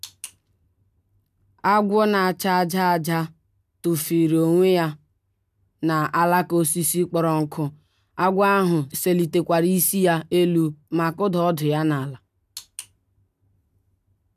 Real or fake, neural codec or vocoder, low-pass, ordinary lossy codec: real; none; 14.4 kHz; none